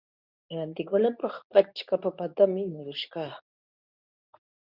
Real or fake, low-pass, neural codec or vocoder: fake; 5.4 kHz; codec, 24 kHz, 0.9 kbps, WavTokenizer, medium speech release version 2